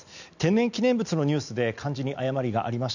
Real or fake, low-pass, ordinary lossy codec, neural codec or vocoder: real; 7.2 kHz; none; none